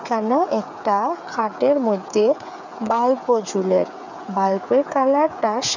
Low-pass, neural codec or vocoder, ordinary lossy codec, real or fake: 7.2 kHz; codec, 16 kHz, 8 kbps, FreqCodec, smaller model; none; fake